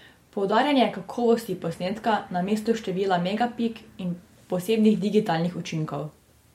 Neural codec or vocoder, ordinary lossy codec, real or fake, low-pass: none; MP3, 64 kbps; real; 19.8 kHz